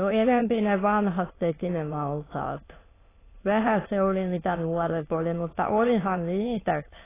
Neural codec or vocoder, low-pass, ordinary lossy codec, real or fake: autoencoder, 22.05 kHz, a latent of 192 numbers a frame, VITS, trained on many speakers; 3.6 kHz; AAC, 16 kbps; fake